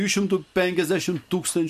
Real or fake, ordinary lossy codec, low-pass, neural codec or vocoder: real; MP3, 64 kbps; 14.4 kHz; none